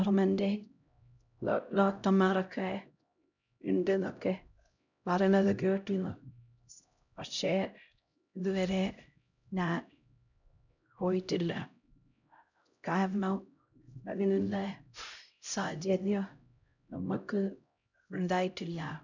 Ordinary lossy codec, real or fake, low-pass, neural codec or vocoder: none; fake; 7.2 kHz; codec, 16 kHz, 0.5 kbps, X-Codec, HuBERT features, trained on LibriSpeech